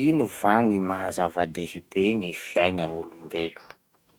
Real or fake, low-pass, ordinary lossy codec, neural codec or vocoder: fake; 19.8 kHz; none; codec, 44.1 kHz, 2.6 kbps, DAC